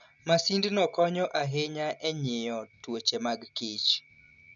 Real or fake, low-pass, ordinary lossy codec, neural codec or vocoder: real; 7.2 kHz; none; none